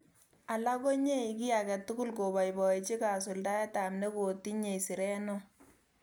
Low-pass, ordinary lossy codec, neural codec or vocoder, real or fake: none; none; none; real